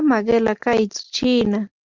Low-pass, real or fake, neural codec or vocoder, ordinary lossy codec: 7.2 kHz; real; none; Opus, 32 kbps